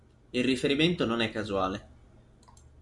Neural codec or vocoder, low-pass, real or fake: none; 10.8 kHz; real